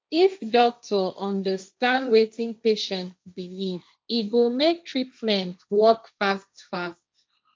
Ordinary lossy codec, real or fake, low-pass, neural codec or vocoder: none; fake; none; codec, 16 kHz, 1.1 kbps, Voila-Tokenizer